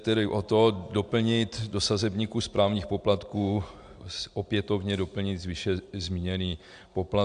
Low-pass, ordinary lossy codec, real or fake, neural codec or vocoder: 9.9 kHz; AAC, 64 kbps; real; none